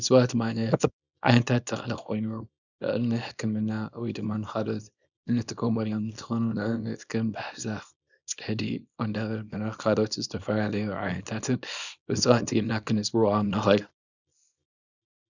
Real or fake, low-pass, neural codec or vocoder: fake; 7.2 kHz; codec, 24 kHz, 0.9 kbps, WavTokenizer, small release